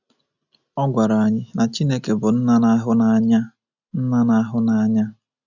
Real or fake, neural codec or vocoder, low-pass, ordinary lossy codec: real; none; 7.2 kHz; none